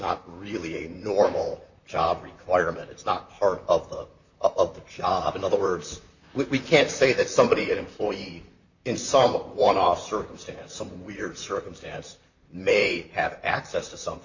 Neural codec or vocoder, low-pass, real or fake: vocoder, 44.1 kHz, 128 mel bands, Pupu-Vocoder; 7.2 kHz; fake